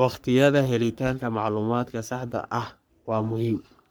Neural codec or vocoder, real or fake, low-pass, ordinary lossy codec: codec, 44.1 kHz, 3.4 kbps, Pupu-Codec; fake; none; none